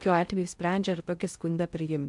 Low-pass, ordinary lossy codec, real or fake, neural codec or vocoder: 10.8 kHz; MP3, 96 kbps; fake; codec, 16 kHz in and 24 kHz out, 0.6 kbps, FocalCodec, streaming, 4096 codes